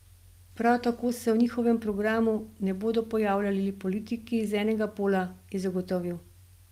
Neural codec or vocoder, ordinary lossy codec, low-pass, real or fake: none; Opus, 32 kbps; 14.4 kHz; real